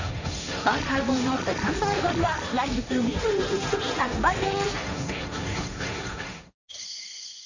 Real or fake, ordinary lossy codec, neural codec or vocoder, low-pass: fake; none; codec, 16 kHz, 1.1 kbps, Voila-Tokenizer; 7.2 kHz